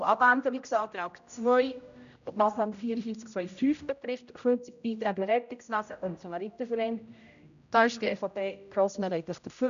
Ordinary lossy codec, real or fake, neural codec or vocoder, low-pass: AAC, 96 kbps; fake; codec, 16 kHz, 0.5 kbps, X-Codec, HuBERT features, trained on general audio; 7.2 kHz